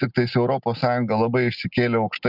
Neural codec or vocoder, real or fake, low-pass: none; real; 5.4 kHz